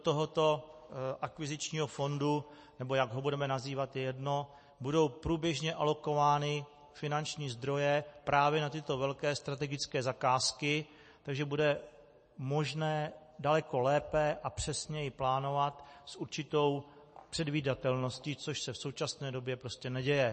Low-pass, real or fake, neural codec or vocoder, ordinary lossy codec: 10.8 kHz; real; none; MP3, 32 kbps